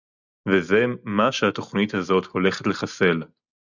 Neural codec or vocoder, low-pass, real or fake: none; 7.2 kHz; real